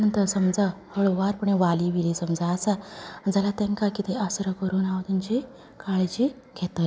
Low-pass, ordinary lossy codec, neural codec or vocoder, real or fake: none; none; none; real